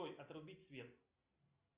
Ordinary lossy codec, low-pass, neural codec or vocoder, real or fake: Opus, 64 kbps; 3.6 kHz; none; real